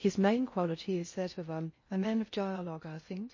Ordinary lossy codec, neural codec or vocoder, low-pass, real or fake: MP3, 32 kbps; codec, 16 kHz in and 24 kHz out, 0.6 kbps, FocalCodec, streaming, 4096 codes; 7.2 kHz; fake